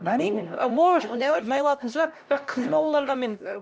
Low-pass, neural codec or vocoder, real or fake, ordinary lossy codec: none; codec, 16 kHz, 1 kbps, X-Codec, HuBERT features, trained on LibriSpeech; fake; none